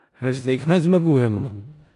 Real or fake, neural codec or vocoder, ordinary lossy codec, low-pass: fake; codec, 16 kHz in and 24 kHz out, 0.4 kbps, LongCat-Audio-Codec, four codebook decoder; AAC, 48 kbps; 10.8 kHz